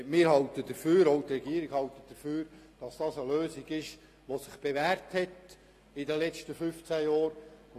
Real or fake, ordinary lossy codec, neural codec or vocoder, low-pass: real; AAC, 48 kbps; none; 14.4 kHz